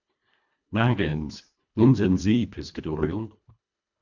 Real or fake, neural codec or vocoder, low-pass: fake; codec, 24 kHz, 1.5 kbps, HILCodec; 7.2 kHz